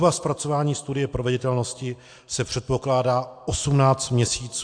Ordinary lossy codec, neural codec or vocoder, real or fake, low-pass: AAC, 64 kbps; none; real; 9.9 kHz